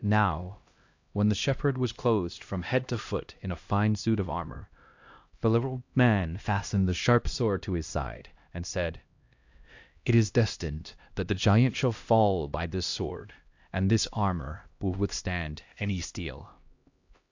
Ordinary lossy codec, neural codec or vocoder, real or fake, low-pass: AAC, 48 kbps; codec, 16 kHz, 1 kbps, X-Codec, HuBERT features, trained on LibriSpeech; fake; 7.2 kHz